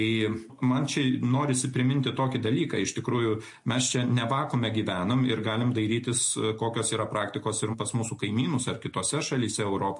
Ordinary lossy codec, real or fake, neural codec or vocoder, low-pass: MP3, 48 kbps; real; none; 10.8 kHz